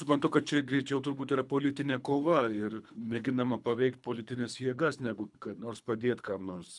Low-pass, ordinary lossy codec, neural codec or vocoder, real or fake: 10.8 kHz; MP3, 96 kbps; codec, 24 kHz, 3 kbps, HILCodec; fake